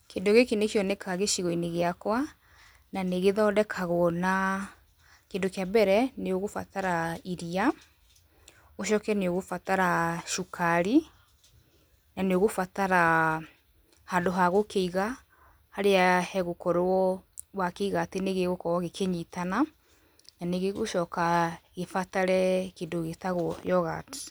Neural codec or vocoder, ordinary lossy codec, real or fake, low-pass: none; none; real; none